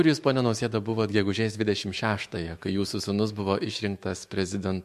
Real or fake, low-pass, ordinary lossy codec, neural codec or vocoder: fake; 14.4 kHz; MP3, 64 kbps; autoencoder, 48 kHz, 128 numbers a frame, DAC-VAE, trained on Japanese speech